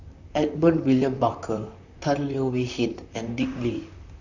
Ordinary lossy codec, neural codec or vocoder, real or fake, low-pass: none; vocoder, 44.1 kHz, 128 mel bands, Pupu-Vocoder; fake; 7.2 kHz